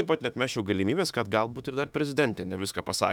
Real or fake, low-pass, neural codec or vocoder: fake; 19.8 kHz; autoencoder, 48 kHz, 32 numbers a frame, DAC-VAE, trained on Japanese speech